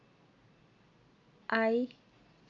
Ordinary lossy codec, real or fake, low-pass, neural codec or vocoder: none; real; 7.2 kHz; none